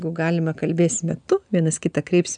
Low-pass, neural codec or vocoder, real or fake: 9.9 kHz; none; real